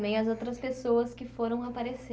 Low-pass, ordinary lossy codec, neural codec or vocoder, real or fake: none; none; none; real